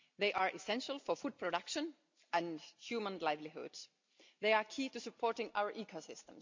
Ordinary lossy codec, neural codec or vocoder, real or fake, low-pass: MP3, 64 kbps; none; real; 7.2 kHz